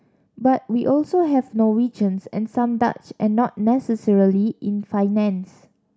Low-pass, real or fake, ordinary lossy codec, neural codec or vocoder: none; real; none; none